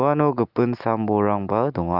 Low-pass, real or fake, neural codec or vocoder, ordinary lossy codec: 5.4 kHz; real; none; Opus, 24 kbps